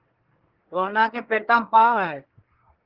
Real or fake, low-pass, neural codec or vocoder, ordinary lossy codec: fake; 5.4 kHz; codec, 24 kHz, 1 kbps, SNAC; Opus, 16 kbps